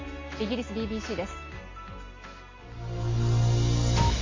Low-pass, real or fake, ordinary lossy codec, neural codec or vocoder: 7.2 kHz; real; AAC, 32 kbps; none